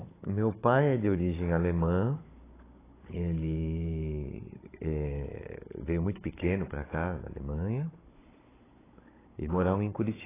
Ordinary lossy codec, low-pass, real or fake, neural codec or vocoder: AAC, 16 kbps; 3.6 kHz; fake; codec, 16 kHz, 16 kbps, FunCodec, trained on Chinese and English, 50 frames a second